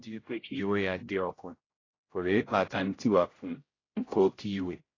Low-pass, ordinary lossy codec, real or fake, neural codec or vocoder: 7.2 kHz; AAC, 32 kbps; fake; codec, 16 kHz, 0.5 kbps, X-Codec, HuBERT features, trained on general audio